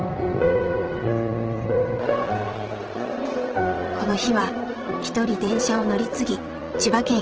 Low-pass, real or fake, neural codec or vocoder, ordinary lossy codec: 7.2 kHz; fake; vocoder, 22.05 kHz, 80 mel bands, Vocos; Opus, 16 kbps